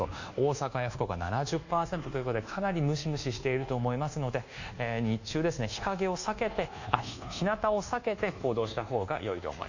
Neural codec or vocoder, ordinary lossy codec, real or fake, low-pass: codec, 24 kHz, 1.2 kbps, DualCodec; none; fake; 7.2 kHz